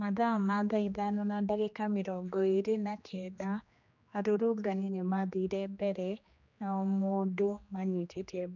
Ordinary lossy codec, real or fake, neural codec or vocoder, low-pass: none; fake; codec, 16 kHz, 2 kbps, X-Codec, HuBERT features, trained on general audio; 7.2 kHz